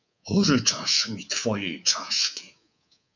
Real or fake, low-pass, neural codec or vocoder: fake; 7.2 kHz; codec, 24 kHz, 3.1 kbps, DualCodec